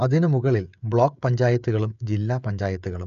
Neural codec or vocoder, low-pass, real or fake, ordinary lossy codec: codec, 16 kHz, 16 kbps, FreqCodec, smaller model; 7.2 kHz; fake; none